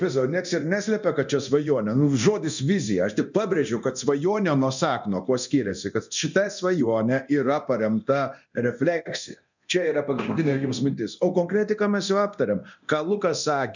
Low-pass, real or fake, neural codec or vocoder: 7.2 kHz; fake; codec, 24 kHz, 0.9 kbps, DualCodec